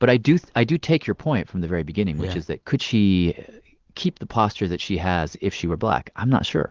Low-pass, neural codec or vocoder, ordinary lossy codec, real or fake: 7.2 kHz; none; Opus, 16 kbps; real